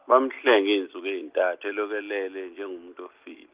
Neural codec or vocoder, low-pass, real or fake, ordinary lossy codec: none; 3.6 kHz; real; Opus, 24 kbps